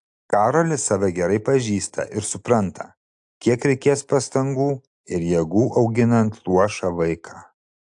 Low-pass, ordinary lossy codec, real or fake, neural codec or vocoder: 10.8 kHz; AAC, 64 kbps; real; none